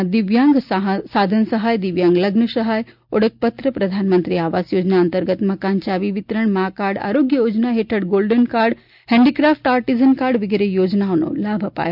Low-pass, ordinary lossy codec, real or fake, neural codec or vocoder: 5.4 kHz; none; real; none